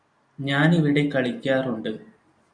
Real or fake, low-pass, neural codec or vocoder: real; 9.9 kHz; none